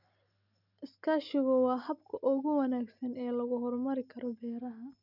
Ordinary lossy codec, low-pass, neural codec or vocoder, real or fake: none; 5.4 kHz; none; real